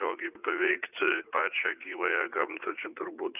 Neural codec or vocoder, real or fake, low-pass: vocoder, 44.1 kHz, 80 mel bands, Vocos; fake; 3.6 kHz